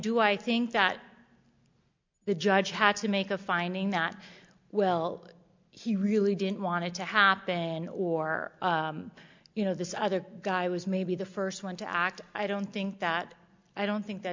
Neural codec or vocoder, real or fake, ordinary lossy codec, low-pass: none; real; MP3, 64 kbps; 7.2 kHz